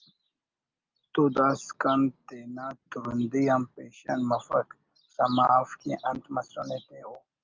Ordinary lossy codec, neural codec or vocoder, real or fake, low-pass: Opus, 24 kbps; none; real; 7.2 kHz